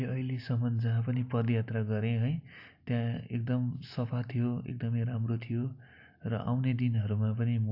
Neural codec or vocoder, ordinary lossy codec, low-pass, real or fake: none; AAC, 48 kbps; 5.4 kHz; real